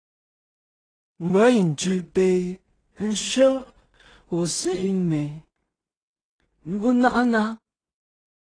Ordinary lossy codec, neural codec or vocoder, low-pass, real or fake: AAC, 32 kbps; codec, 16 kHz in and 24 kHz out, 0.4 kbps, LongCat-Audio-Codec, two codebook decoder; 9.9 kHz; fake